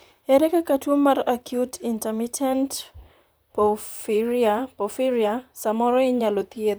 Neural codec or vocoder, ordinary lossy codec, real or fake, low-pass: vocoder, 44.1 kHz, 128 mel bands, Pupu-Vocoder; none; fake; none